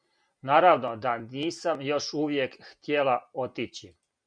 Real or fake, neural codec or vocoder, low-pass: real; none; 9.9 kHz